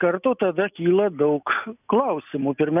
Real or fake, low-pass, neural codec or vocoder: real; 3.6 kHz; none